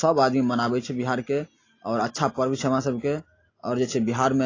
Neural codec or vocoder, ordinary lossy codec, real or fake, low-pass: none; AAC, 32 kbps; real; 7.2 kHz